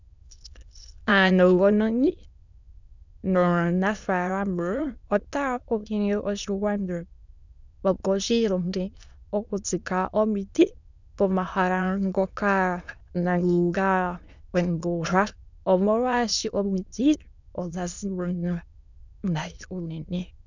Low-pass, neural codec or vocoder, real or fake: 7.2 kHz; autoencoder, 22.05 kHz, a latent of 192 numbers a frame, VITS, trained on many speakers; fake